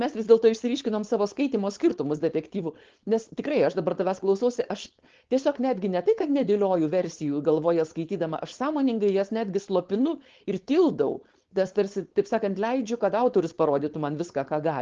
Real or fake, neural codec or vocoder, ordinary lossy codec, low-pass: fake; codec, 16 kHz, 4.8 kbps, FACodec; Opus, 16 kbps; 7.2 kHz